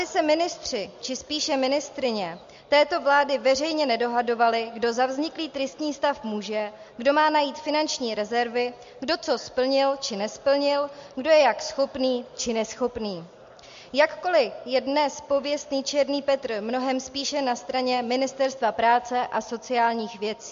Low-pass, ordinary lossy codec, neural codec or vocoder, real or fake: 7.2 kHz; MP3, 48 kbps; none; real